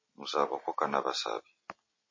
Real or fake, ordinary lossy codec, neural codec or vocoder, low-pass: real; MP3, 32 kbps; none; 7.2 kHz